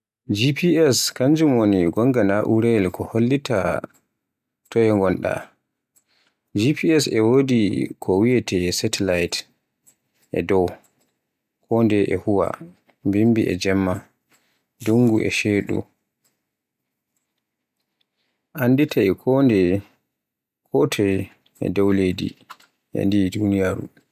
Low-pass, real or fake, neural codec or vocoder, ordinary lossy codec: 14.4 kHz; real; none; none